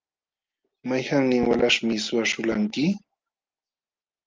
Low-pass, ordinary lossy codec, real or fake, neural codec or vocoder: 7.2 kHz; Opus, 32 kbps; real; none